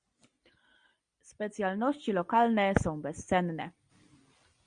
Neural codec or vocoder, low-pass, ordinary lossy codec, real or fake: none; 9.9 kHz; Opus, 64 kbps; real